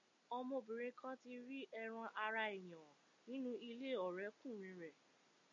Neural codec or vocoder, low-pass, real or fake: none; 7.2 kHz; real